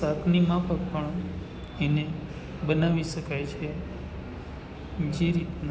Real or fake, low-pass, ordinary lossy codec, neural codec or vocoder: real; none; none; none